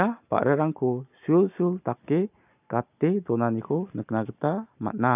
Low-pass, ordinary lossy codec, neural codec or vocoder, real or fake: 3.6 kHz; none; none; real